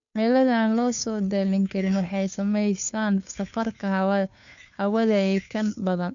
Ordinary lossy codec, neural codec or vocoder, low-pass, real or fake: none; codec, 16 kHz, 2 kbps, FunCodec, trained on Chinese and English, 25 frames a second; 7.2 kHz; fake